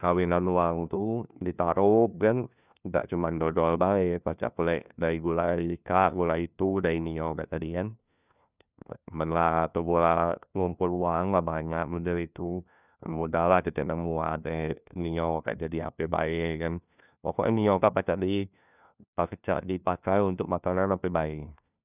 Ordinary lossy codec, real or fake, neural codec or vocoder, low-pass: none; fake; codec, 16 kHz, 1 kbps, FunCodec, trained on LibriTTS, 50 frames a second; 3.6 kHz